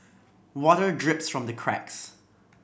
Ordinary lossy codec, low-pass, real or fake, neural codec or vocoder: none; none; real; none